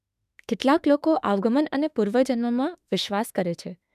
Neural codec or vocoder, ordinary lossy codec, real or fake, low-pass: autoencoder, 48 kHz, 32 numbers a frame, DAC-VAE, trained on Japanese speech; none; fake; 14.4 kHz